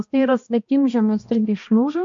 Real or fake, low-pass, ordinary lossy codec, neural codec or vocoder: fake; 7.2 kHz; MP3, 48 kbps; codec, 16 kHz, 1 kbps, X-Codec, HuBERT features, trained on balanced general audio